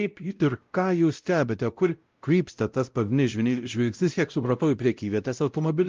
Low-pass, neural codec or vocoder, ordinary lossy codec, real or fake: 7.2 kHz; codec, 16 kHz, 0.5 kbps, X-Codec, WavLM features, trained on Multilingual LibriSpeech; Opus, 32 kbps; fake